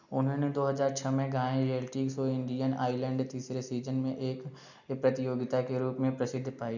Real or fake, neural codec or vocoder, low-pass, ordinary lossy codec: real; none; 7.2 kHz; none